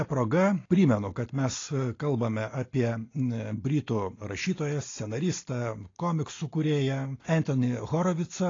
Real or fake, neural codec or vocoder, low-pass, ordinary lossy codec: real; none; 7.2 kHz; AAC, 32 kbps